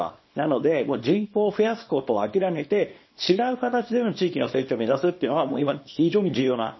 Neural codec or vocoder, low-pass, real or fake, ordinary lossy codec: codec, 24 kHz, 0.9 kbps, WavTokenizer, small release; 7.2 kHz; fake; MP3, 24 kbps